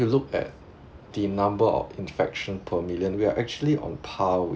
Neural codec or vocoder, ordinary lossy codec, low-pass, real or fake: none; none; none; real